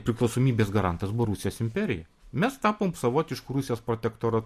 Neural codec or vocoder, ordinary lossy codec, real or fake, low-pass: none; MP3, 64 kbps; real; 14.4 kHz